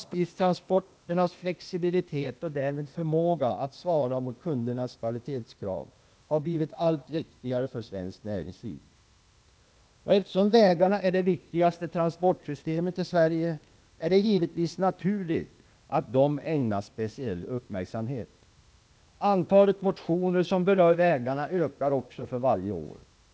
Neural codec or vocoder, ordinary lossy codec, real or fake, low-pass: codec, 16 kHz, 0.8 kbps, ZipCodec; none; fake; none